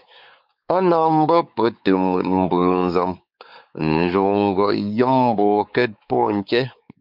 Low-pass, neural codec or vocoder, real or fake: 5.4 kHz; codec, 16 kHz, 4 kbps, X-Codec, WavLM features, trained on Multilingual LibriSpeech; fake